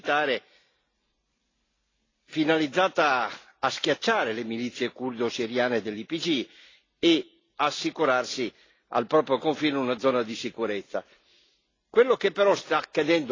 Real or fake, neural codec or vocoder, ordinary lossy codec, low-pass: real; none; AAC, 32 kbps; 7.2 kHz